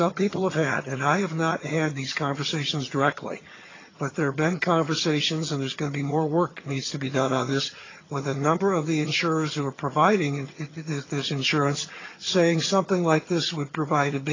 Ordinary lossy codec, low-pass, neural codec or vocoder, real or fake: AAC, 32 kbps; 7.2 kHz; vocoder, 22.05 kHz, 80 mel bands, HiFi-GAN; fake